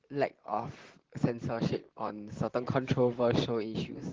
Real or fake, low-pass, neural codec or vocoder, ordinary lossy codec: fake; 7.2 kHz; vocoder, 44.1 kHz, 128 mel bands, Pupu-Vocoder; Opus, 16 kbps